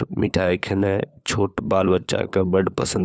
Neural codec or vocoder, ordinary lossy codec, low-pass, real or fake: codec, 16 kHz, 4 kbps, FunCodec, trained on LibriTTS, 50 frames a second; none; none; fake